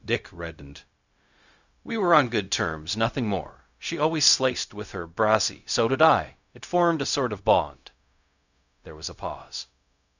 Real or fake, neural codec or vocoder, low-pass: fake; codec, 16 kHz, 0.4 kbps, LongCat-Audio-Codec; 7.2 kHz